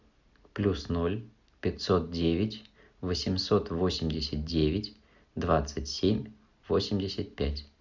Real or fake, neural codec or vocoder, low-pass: real; none; 7.2 kHz